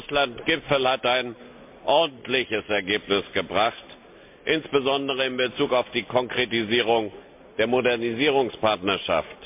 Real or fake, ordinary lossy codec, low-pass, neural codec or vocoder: real; MP3, 32 kbps; 3.6 kHz; none